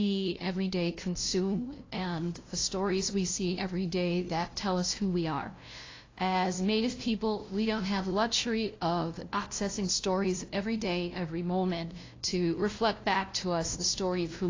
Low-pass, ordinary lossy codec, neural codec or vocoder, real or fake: 7.2 kHz; AAC, 32 kbps; codec, 16 kHz, 0.5 kbps, FunCodec, trained on LibriTTS, 25 frames a second; fake